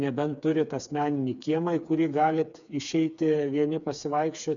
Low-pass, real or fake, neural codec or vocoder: 7.2 kHz; fake; codec, 16 kHz, 4 kbps, FreqCodec, smaller model